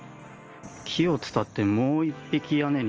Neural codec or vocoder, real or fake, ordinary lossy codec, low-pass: none; real; Opus, 24 kbps; 7.2 kHz